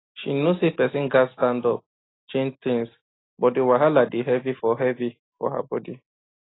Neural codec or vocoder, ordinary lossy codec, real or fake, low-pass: none; AAC, 16 kbps; real; 7.2 kHz